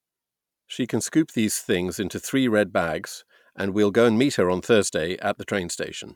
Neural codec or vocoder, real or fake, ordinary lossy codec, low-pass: none; real; none; 19.8 kHz